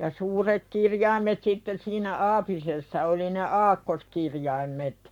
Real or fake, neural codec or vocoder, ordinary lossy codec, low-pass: fake; codec, 44.1 kHz, 7.8 kbps, Pupu-Codec; none; 19.8 kHz